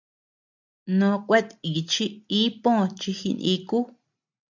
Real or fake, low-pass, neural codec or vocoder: real; 7.2 kHz; none